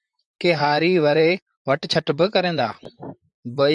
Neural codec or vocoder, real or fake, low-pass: vocoder, 44.1 kHz, 128 mel bands, Pupu-Vocoder; fake; 10.8 kHz